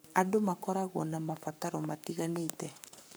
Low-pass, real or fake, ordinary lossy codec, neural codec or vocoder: none; fake; none; codec, 44.1 kHz, 7.8 kbps, Pupu-Codec